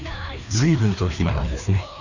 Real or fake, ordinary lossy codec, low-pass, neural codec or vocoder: fake; none; 7.2 kHz; codec, 16 kHz, 2 kbps, FreqCodec, larger model